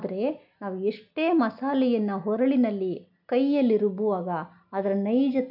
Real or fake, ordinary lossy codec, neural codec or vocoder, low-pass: real; none; none; 5.4 kHz